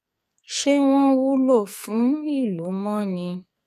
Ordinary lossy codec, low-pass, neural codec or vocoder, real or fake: none; 14.4 kHz; codec, 44.1 kHz, 2.6 kbps, SNAC; fake